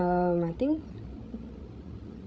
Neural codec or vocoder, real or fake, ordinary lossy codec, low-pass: codec, 16 kHz, 16 kbps, FreqCodec, larger model; fake; none; none